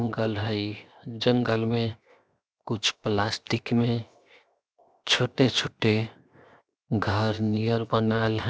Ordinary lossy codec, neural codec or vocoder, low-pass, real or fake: none; codec, 16 kHz, 0.7 kbps, FocalCodec; none; fake